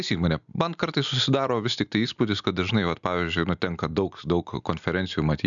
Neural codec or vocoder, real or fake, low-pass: none; real; 7.2 kHz